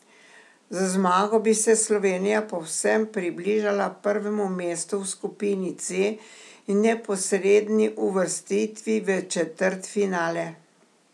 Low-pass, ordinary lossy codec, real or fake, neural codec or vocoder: none; none; real; none